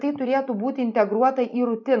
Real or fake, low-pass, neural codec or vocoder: real; 7.2 kHz; none